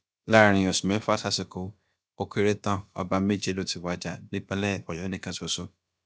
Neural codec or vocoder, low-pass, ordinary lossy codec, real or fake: codec, 16 kHz, about 1 kbps, DyCAST, with the encoder's durations; none; none; fake